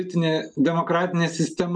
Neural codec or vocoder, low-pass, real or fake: none; 9.9 kHz; real